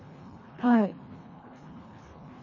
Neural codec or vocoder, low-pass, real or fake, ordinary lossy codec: codec, 24 kHz, 1.5 kbps, HILCodec; 7.2 kHz; fake; MP3, 32 kbps